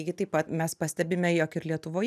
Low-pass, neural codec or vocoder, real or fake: 14.4 kHz; none; real